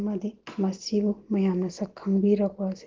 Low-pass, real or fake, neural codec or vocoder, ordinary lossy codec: 7.2 kHz; real; none; Opus, 16 kbps